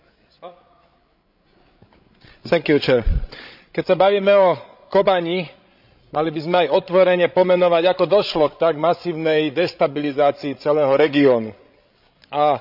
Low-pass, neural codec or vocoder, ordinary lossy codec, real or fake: 5.4 kHz; codec, 16 kHz, 16 kbps, FreqCodec, larger model; none; fake